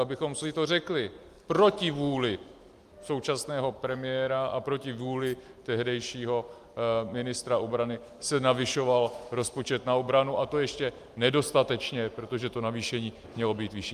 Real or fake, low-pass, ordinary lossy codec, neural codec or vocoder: real; 14.4 kHz; Opus, 32 kbps; none